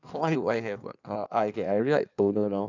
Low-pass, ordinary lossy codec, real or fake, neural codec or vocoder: 7.2 kHz; none; fake; codec, 16 kHz in and 24 kHz out, 1.1 kbps, FireRedTTS-2 codec